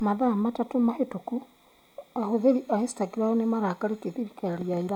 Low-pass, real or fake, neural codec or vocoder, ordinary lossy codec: 19.8 kHz; real; none; none